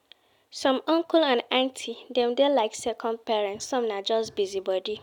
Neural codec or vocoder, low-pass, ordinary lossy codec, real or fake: none; 19.8 kHz; none; real